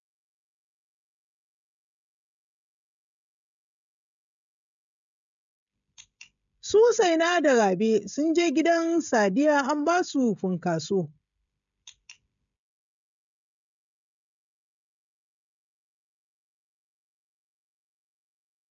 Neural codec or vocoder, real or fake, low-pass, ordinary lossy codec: codec, 16 kHz, 16 kbps, FreqCodec, smaller model; fake; 7.2 kHz; MP3, 64 kbps